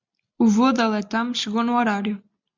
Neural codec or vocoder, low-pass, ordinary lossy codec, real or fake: none; 7.2 kHz; MP3, 64 kbps; real